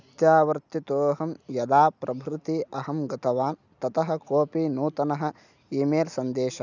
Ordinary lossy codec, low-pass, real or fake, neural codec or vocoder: none; 7.2 kHz; real; none